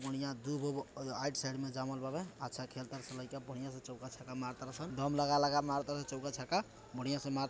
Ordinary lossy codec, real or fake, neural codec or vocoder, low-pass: none; real; none; none